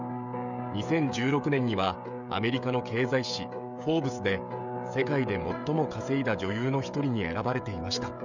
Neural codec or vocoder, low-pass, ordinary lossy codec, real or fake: codec, 16 kHz, 16 kbps, FreqCodec, smaller model; 7.2 kHz; none; fake